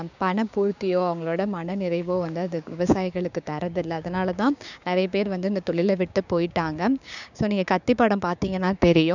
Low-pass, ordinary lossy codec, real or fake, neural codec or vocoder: 7.2 kHz; none; fake; codec, 16 kHz, 6 kbps, DAC